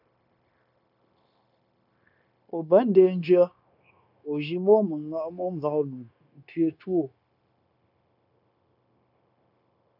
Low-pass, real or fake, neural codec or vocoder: 5.4 kHz; fake; codec, 16 kHz, 0.9 kbps, LongCat-Audio-Codec